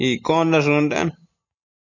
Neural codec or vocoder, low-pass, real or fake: none; 7.2 kHz; real